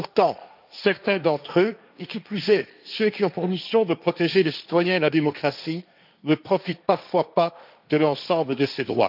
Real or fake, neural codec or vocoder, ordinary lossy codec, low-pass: fake; codec, 16 kHz, 1.1 kbps, Voila-Tokenizer; none; 5.4 kHz